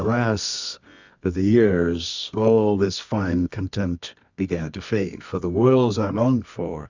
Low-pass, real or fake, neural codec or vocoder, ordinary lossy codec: 7.2 kHz; fake; codec, 24 kHz, 0.9 kbps, WavTokenizer, medium music audio release; Opus, 64 kbps